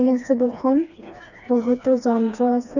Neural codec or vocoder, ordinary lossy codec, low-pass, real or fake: codec, 16 kHz, 2 kbps, FreqCodec, smaller model; none; 7.2 kHz; fake